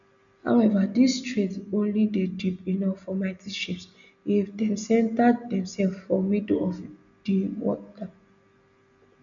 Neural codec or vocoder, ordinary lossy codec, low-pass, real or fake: none; none; 7.2 kHz; real